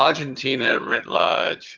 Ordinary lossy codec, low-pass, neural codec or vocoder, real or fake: Opus, 24 kbps; 7.2 kHz; vocoder, 22.05 kHz, 80 mel bands, HiFi-GAN; fake